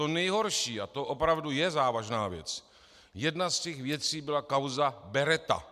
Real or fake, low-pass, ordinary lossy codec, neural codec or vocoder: real; 14.4 kHz; MP3, 96 kbps; none